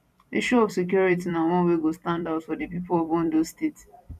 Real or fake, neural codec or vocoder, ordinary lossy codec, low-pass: fake; vocoder, 44.1 kHz, 128 mel bands every 256 samples, BigVGAN v2; none; 14.4 kHz